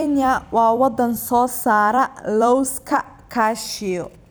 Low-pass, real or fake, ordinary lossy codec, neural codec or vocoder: none; fake; none; vocoder, 44.1 kHz, 128 mel bands every 256 samples, BigVGAN v2